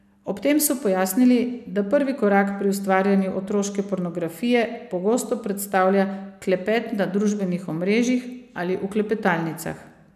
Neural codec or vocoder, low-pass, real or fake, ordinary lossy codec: none; 14.4 kHz; real; none